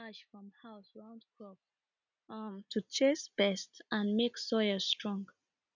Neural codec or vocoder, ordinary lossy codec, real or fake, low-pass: none; none; real; 7.2 kHz